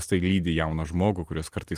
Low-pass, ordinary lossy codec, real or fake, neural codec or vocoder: 14.4 kHz; Opus, 24 kbps; real; none